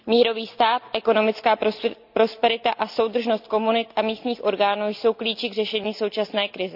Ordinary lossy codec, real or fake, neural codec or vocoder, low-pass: none; real; none; 5.4 kHz